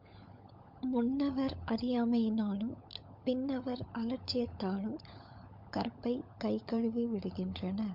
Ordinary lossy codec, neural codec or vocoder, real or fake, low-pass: MP3, 48 kbps; codec, 16 kHz, 16 kbps, FunCodec, trained on LibriTTS, 50 frames a second; fake; 5.4 kHz